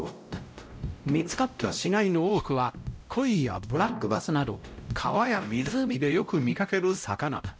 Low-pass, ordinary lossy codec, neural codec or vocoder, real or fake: none; none; codec, 16 kHz, 0.5 kbps, X-Codec, WavLM features, trained on Multilingual LibriSpeech; fake